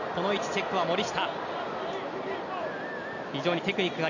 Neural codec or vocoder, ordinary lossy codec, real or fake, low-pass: none; none; real; 7.2 kHz